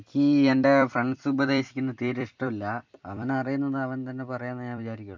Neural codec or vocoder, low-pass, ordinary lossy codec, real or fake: vocoder, 44.1 kHz, 128 mel bands every 256 samples, BigVGAN v2; 7.2 kHz; MP3, 64 kbps; fake